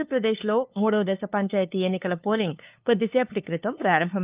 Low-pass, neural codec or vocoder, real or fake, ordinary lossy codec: 3.6 kHz; codec, 16 kHz, 2 kbps, FunCodec, trained on LibriTTS, 25 frames a second; fake; Opus, 32 kbps